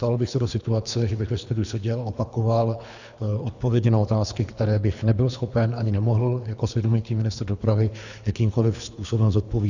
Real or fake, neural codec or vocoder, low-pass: fake; codec, 24 kHz, 3 kbps, HILCodec; 7.2 kHz